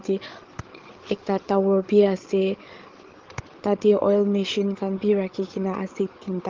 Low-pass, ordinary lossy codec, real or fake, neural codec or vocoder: 7.2 kHz; Opus, 16 kbps; fake; codec, 16 kHz, 8 kbps, FreqCodec, larger model